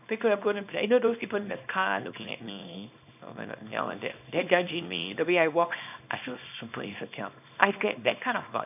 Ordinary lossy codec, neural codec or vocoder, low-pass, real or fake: none; codec, 24 kHz, 0.9 kbps, WavTokenizer, small release; 3.6 kHz; fake